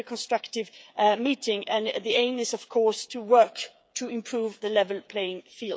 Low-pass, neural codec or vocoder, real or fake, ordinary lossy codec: none; codec, 16 kHz, 8 kbps, FreqCodec, smaller model; fake; none